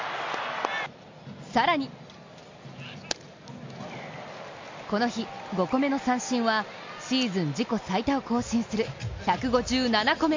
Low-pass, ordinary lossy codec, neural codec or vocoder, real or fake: 7.2 kHz; MP3, 48 kbps; none; real